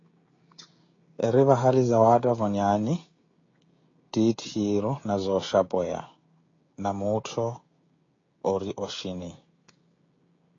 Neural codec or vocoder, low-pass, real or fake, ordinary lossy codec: codec, 16 kHz, 16 kbps, FreqCodec, smaller model; 7.2 kHz; fake; AAC, 32 kbps